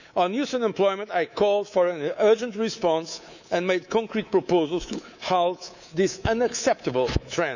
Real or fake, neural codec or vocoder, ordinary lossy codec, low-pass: fake; codec, 24 kHz, 3.1 kbps, DualCodec; none; 7.2 kHz